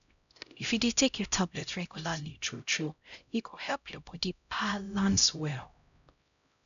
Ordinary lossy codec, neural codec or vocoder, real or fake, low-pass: none; codec, 16 kHz, 0.5 kbps, X-Codec, HuBERT features, trained on LibriSpeech; fake; 7.2 kHz